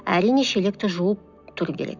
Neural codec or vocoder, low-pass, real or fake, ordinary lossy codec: none; 7.2 kHz; real; none